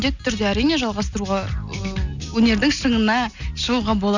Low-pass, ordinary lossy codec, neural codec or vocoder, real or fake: 7.2 kHz; none; none; real